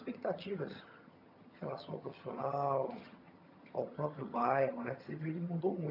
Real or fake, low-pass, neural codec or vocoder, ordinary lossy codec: fake; 5.4 kHz; vocoder, 22.05 kHz, 80 mel bands, HiFi-GAN; none